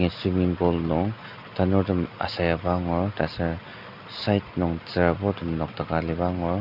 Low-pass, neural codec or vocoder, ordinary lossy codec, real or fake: 5.4 kHz; none; none; real